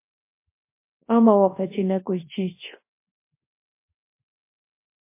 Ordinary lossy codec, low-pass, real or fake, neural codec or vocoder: MP3, 24 kbps; 3.6 kHz; fake; codec, 24 kHz, 0.9 kbps, WavTokenizer, large speech release